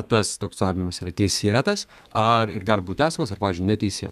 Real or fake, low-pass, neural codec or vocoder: fake; 14.4 kHz; codec, 32 kHz, 1.9 kbps, SNAC